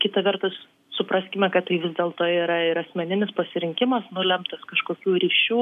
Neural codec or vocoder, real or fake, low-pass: none; real; 14.4 kHz